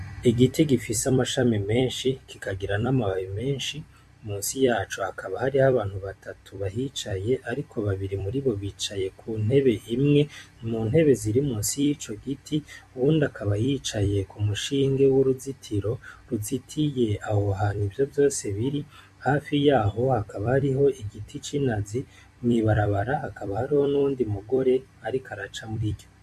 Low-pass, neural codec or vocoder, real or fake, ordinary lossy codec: 14.4 kHz; vocoder, 44.1 kHz, 128 mel bands every 256 samples, BigVGAN v2; fake; MP3, 64 kbps